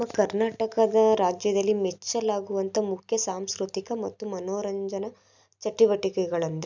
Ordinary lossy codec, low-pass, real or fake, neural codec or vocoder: none; 7.2 kHz; real; none